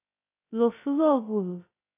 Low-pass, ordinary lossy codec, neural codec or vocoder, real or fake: 3.6 kHz; AAC, 16 kbps; codec, 16 kHz, 0.2 kbps, FocalCodec; fake